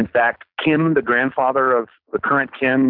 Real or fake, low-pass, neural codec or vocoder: real; 5.4 kHz; none